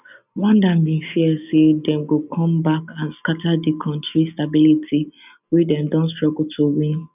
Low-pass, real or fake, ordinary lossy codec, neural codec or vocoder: 3.6 kHz; real; none; none